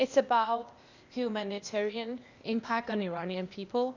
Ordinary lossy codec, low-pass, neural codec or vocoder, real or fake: Opus, 64 kbps; 7.2 kHz; codec, 16 kHz, 0.8 kbps, ZipCodec; fake